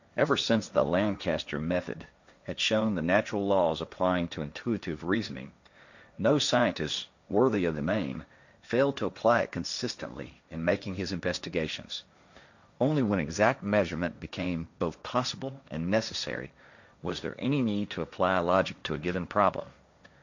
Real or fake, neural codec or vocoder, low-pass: fake; codec, 16 kHz, 1.1 kbps, Voila-Tokenizer; 7.2 kHz